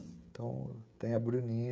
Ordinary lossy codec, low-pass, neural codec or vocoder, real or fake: none; none; codec, 16 kHz, 16 kbps, FreqCodec, smaller model; fake